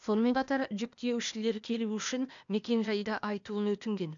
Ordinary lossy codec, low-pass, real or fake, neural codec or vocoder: none; 7.2 kHz; fake; codec, 16 kHz, 0.8 kbps, ZipCodec